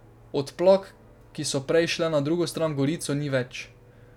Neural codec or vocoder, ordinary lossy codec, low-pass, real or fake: none; none; 19.8 kHz; real